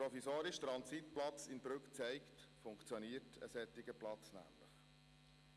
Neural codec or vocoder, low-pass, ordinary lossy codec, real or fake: none; none; none; real